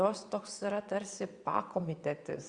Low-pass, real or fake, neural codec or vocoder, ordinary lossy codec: 9.9 kHz; fake; vocoder, 22.05 kHz, 80 mel bands, WaveNeXt; Opus, 64 kbps